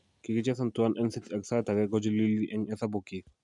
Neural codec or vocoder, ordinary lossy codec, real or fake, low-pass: none; none; real; 10.8 kHz